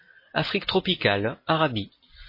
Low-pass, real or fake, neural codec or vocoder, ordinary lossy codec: 5.4 kHz; real; none; MP3, 24 kbps